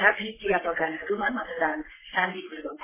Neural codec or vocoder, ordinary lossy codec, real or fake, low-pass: codec, 44.1 kHz, 3.4 kbps, Pupu-Codec; MP3, 16 kbps; fake; 3.6 kHz